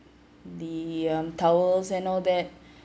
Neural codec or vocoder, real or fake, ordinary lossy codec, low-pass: none; real; none; none